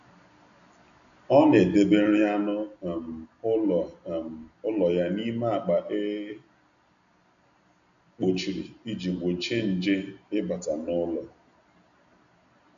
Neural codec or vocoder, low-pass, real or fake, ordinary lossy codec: none; 7.2 kHz; real; none